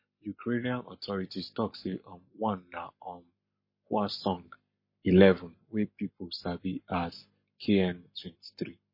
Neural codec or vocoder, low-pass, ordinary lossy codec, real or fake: codec, 44.1 kHz, 7.8 kbps, Pupu-Codec; 5.4 kHz; MP3, 24 kbps; fake